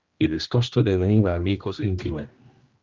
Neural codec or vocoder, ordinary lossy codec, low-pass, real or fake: codec, 16 kHz, 1 kbps, X-Codec, HuBERT features, trained on general audio; Opus, 24 kbps; 7.2 kHz; fake